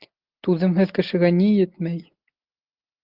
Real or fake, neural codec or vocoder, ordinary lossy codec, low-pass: real; none; Opus, 32 kbps; 5.4 kHz